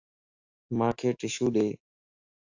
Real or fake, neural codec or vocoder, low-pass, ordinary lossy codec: fake; autoencoder, 48 kHz, 128 numbers a frame, DAC-VAE, trained on Japanese speech; 7.2 kHz; AAC, 48 kbps